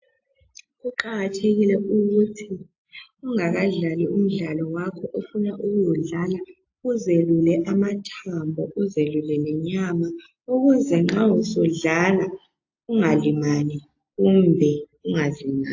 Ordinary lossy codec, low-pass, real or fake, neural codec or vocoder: AAC, 48 kbps; 7.2 kHz; real; none